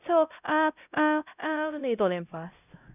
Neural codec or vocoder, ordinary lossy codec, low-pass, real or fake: codec, 16 kHz, 0.5 kbps, X-Codec, HuBERT features, trained on LibriSpeech; none; 3.6 kHz; fake